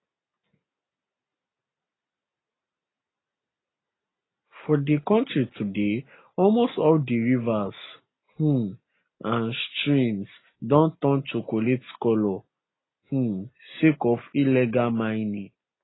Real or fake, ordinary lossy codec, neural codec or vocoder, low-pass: real; AAC, 16 kbps; none; 7.2 kHz